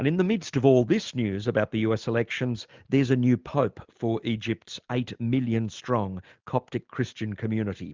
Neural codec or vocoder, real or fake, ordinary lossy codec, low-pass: none; real; Opus, 24 kbps; 7.2 kHz